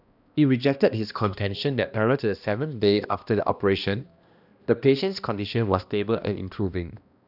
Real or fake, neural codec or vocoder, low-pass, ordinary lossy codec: fake; codec, 16 kHz, 1 kbps, X-Codec, HuBERT features, trained on balanced general audio; 5.4 kHz; none